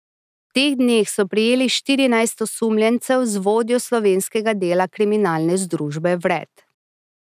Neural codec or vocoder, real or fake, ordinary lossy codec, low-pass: none; real; none; 14.4 kHz